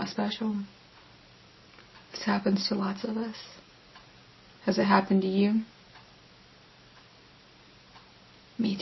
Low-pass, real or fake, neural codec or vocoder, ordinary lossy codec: 7.2 kHz; real; none; MP3, 24 kbps